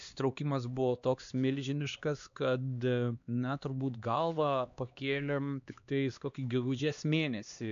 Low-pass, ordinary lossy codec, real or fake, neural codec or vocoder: 7.2 kHz; AAC, 64 kbps; fake; codec, 16 kHz, 2 kbps, X-Codec, HuBERT features, trained on LibriSpeech